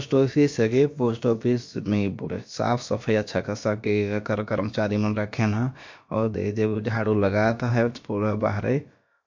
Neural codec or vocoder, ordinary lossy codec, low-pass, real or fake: codec, 16 kHz, about 1 kbps, DyCAST, with the encoder's durations; MP3, 48 kbps; 7.2 kHz; fake